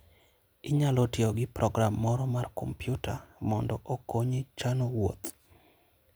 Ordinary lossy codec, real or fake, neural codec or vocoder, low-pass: none; real; none; none